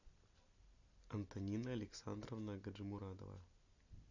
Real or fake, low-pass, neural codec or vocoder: real; 7.2 kHz; none